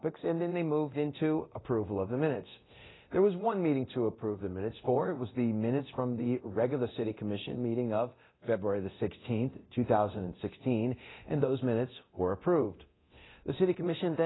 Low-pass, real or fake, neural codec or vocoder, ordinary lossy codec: 7.2 kHz; fake; codec, 24 kHz, 0.9 kbps, DualCodec; AAC, 16 kbps